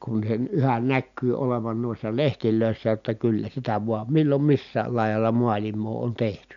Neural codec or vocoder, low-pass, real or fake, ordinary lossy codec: none; 7.2 kHz; real; none